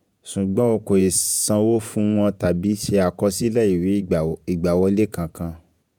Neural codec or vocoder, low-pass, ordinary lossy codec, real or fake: vocoder, 48 kHz, 128 mel bands, Vocos; none; none; fake